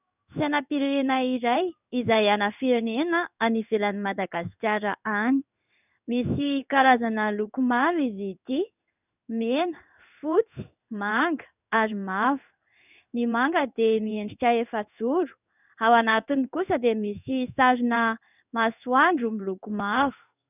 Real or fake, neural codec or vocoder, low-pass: fake; codec, 16 kHz in and 24 kHz out, 1 kbps, XY-Tokenizer; 3.6 kHz